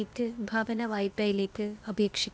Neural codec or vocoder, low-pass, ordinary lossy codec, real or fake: codec, 16 kHz, 0.8 kbps, ZipCodec; none; none; fake